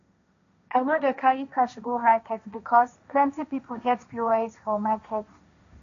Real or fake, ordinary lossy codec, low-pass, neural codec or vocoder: fake; none; 7.2 kHz; codec, 16 kHz, 1.1 kbps, Voila-Tokenizer